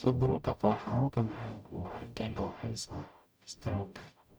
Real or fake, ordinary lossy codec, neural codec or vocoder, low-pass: fake; none; codec, 44.1 kHz, 0.9 kbps, DAC; none